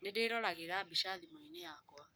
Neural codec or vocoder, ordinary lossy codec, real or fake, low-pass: codec, 44.1 kHz, 7.8 kbps, Pupu-Codec; none; fake; none